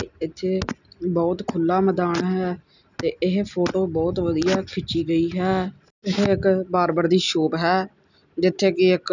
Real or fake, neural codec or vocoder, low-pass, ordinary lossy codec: real; none; 7.2 kHz; none